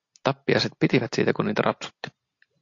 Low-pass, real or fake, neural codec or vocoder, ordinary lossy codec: 7.2 kHz; real; none; AAC, 48 kbps